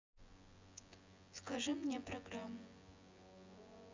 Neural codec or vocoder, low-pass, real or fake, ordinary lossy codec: vocoder, 24 kHz, 100 mel bands, Vocos; 7.2 kHz; fake; MP3, 64 kbps